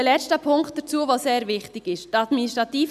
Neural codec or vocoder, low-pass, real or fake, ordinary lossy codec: none; 14.4 kHz; real; none